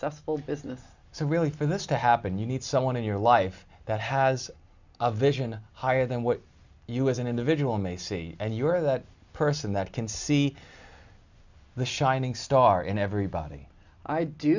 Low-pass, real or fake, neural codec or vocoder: 7.2 kHz; real; none